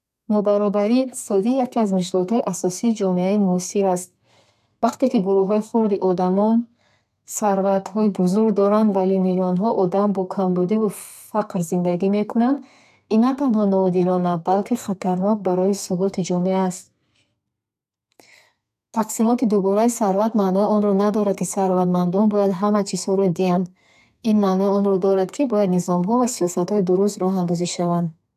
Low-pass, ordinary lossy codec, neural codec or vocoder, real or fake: 14.4 kHz; none; codec, 32 kHz, 1.9 kbps, SNAC; fake